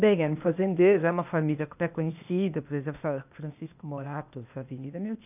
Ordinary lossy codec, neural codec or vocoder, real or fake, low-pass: none; codec, 16 kHz, 0.8 kbps, ZipCodec; fake; 3.6 kHz